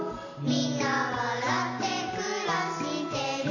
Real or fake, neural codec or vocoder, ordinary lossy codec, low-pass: real; none; none; 7.2 kHz